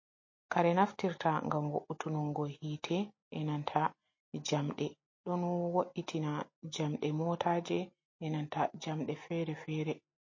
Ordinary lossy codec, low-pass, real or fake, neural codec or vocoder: MP3, 32 kbps; 7.2 kHz; real; none